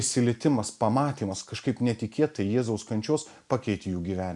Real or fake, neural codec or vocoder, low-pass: real; none; 10.8 kHz